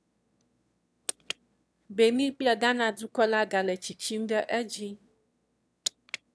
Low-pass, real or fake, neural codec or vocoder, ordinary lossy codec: none; fake; autoencoder, 22.05 kHz, a latent of 192 numbers a frame, VITS, trained on one speaker; none